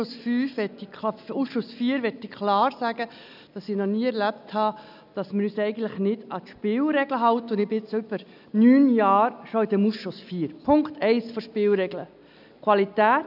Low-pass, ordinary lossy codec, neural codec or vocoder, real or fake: 5.4 kHz; none; none; real